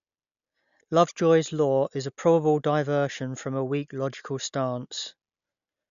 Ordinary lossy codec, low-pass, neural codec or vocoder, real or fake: none; 7.2 kHz; none; real